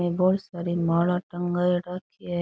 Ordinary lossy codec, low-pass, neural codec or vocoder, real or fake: none; none; none; real